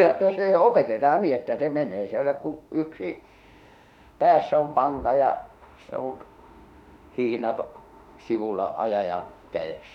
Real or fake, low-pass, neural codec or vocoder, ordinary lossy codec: fake; 19.8 kHz; autoencoder, 48 kHz, 32 numbers a frame, DAC-VAE, trained on Japanese speech; none